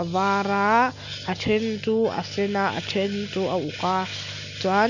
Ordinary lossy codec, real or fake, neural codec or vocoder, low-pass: none; real; none; 7.2 kHz